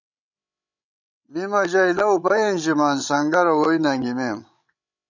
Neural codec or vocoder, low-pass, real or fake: codec, 16 kHz, 16 kbps, FreqCodec, larger model; 7.2 kHz; fake